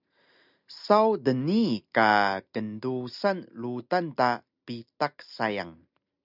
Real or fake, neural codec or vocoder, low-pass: real; none; 5.4 kHz